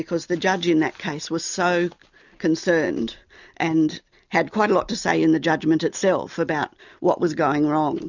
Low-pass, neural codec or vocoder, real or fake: 7.2 kHz; none; real